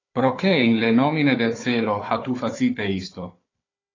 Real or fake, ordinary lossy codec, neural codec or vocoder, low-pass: fake; AAC, 32 kbps; codec, 16 kHz, 4 kbps, FunCodec, trained on Chinese and English, 50 frames a second; 7.2 kHz